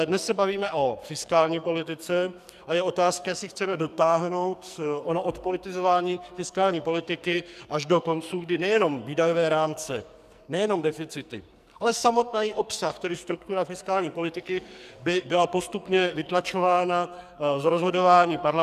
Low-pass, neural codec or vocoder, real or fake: 14.4 kHz; codec, 44.1 kHz, 2.6 kbps, SNAC; fake